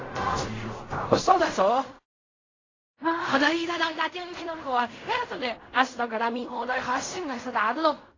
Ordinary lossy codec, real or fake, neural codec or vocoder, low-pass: AAC, 32 kbps; fake; codec, 16 kHz in and 24 kHz out, 0.4 kbps, LongCat-Audio-Codec, fine tuned four codebook decoder; 7.2 kHz